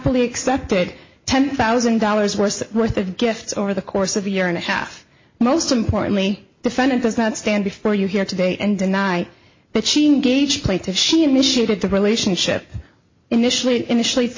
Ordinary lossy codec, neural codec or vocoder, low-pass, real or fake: MP3, 32 kbps; none; 7.2 kHz; real